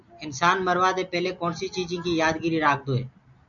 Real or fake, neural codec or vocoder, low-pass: real; none; 7.2 kHz